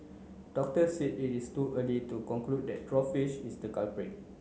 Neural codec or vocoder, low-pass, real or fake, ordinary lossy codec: none; none; real; none